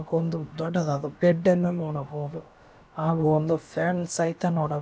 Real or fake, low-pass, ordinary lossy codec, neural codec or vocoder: fake; none; none; codec, 16 kHz, about 1 kbps, DyCAST, with the encoder's durations